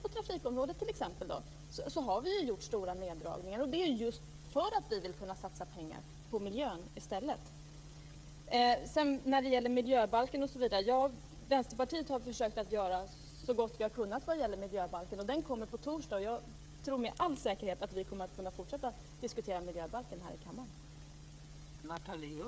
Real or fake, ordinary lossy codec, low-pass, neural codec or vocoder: fake; none; none; codec, 16 kHz, 16 kbps, FreqCodec, smaller model